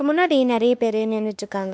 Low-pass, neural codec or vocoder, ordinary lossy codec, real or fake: none; codec, 16 kHz, 2 kbps, X-Codec, WavLM features, trained on Multilingual LibriSpeech; none; fake